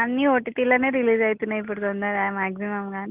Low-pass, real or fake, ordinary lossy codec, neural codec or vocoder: 3.6 kHz; real; Opus, 32 kbps; none